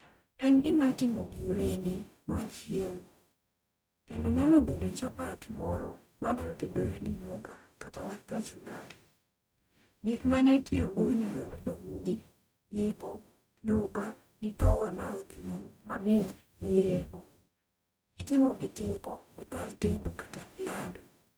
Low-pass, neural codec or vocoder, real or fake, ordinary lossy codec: none; codec, 44.1 kHz, 0.9 kbps, DAC; fake; none